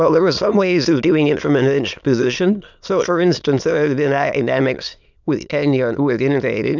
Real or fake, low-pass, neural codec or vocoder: fake; 7.2 kHz; autoencoder, 22.05 kHz, a latent of 192 numbers a frame, VITS, trained on many speakers